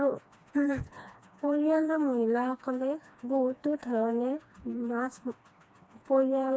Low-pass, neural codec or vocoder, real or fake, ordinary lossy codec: none; codec, 16 kHz, 2 kbps, FreqCodec, smaller model; fake; none